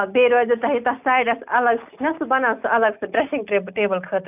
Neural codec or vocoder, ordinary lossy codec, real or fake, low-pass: none; none; real; 3.6 kHz